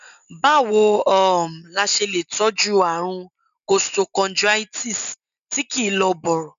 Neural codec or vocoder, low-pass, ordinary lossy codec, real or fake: none; 7.2 kHz; none; real